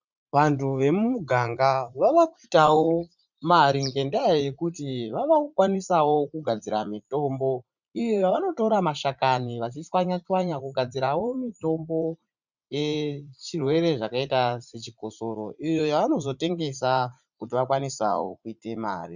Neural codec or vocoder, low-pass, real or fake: vocoder, 44.1 kHz, 80 mel bands, Vocos; 7.2 kHz; fake